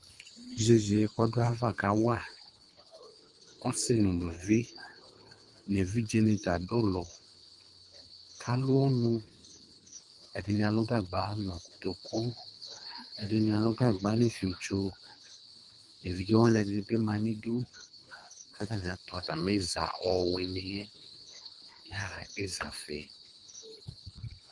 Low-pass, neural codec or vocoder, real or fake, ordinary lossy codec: 10.8 kHz; codec, 24 kHz, 3 kbps, HILCodec; fake; Opus, 32 kbps